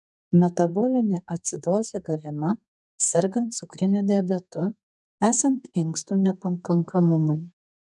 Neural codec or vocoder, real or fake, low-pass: codec, 32 kHz, 1.9 kbps, SNAC; fake; 10.8 kHz